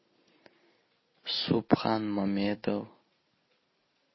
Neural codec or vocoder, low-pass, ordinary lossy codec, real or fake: none; 7.2 kHz; MP3, 24 kbps; real